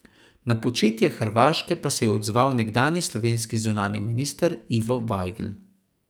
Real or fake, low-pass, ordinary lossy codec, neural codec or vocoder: fake; none; none; codec, 44.1 kHz, 2.6 kbps, SNAC